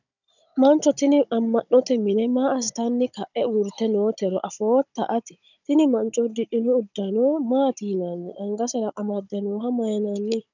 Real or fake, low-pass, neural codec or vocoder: fake; 7.2 kHz; codec, 16 kHz, 16 kbps, FunCodec, trained on Chinese and English, 50 frames a second